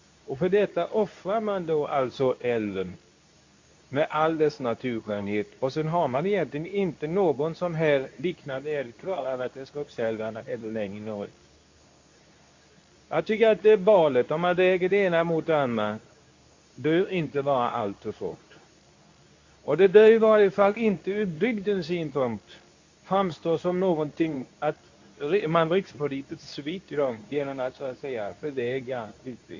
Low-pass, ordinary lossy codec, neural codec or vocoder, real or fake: 7.2 kHz; AAC, 48 kbps; codec, 24 kHz, 0.9 kbps, WavTokenizer, medium speech release version 2; fake